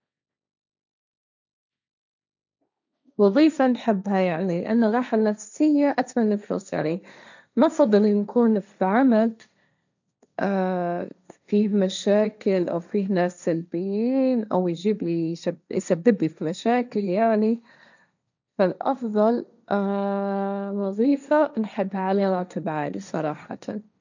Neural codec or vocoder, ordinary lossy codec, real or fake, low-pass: codec, 16 kHz, 1.1 kbps, Voila-Tokenizer; none; fake; 7.2 kHz